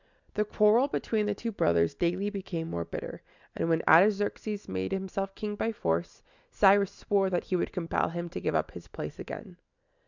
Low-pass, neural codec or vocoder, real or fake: 7.2 kHz; none; real